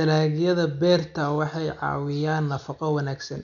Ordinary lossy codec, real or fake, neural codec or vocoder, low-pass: none; real; none; 7.2 kHz